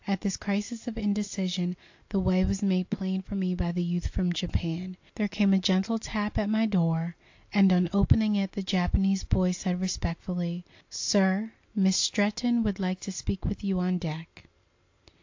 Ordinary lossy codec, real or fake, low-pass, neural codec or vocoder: AAC, 48 kbps; real; 7.2 kHz; none